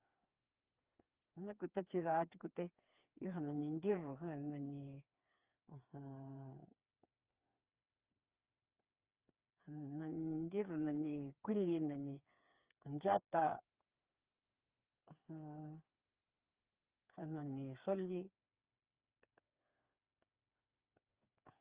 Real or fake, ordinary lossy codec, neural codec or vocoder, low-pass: fake; Opus, 32 kbps; codec, 16 kHz, 4 kbps, FreqCodec, smaller model; 3.6 kHz